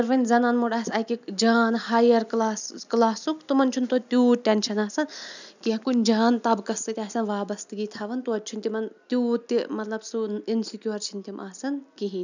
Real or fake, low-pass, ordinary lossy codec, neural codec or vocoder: real; 7.2 kHz; none; none